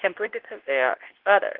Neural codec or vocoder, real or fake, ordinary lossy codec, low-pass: codec, 24 kHz, 0.9 kbps, WavTokenizer, medium speech release version 2; fake; Opus, 16 kbps; 5.4 kHz